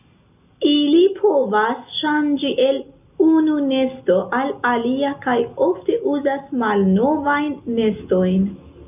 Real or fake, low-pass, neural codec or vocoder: real; 3.6 kHz; none